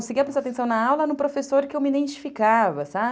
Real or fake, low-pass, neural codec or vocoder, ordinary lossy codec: real; none; none; none